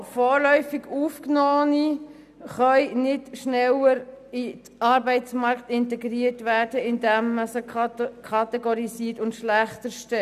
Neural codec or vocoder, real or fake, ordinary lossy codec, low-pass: none; real; none; 14.4 kHz